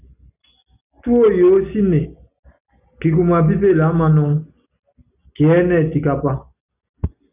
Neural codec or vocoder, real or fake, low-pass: none; real; 3.6 kHz